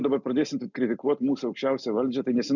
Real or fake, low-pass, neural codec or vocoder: real; 7.2 kHz; none